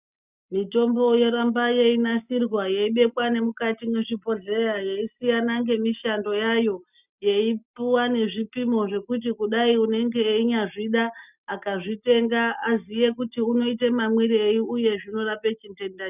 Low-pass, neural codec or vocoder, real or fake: 3.6 kHz; none; real